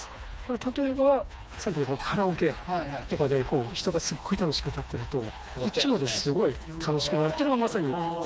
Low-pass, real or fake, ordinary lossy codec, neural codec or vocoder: none; fake; none; codec, 16 kHz, 2 kbps, FreqCodec, smaller model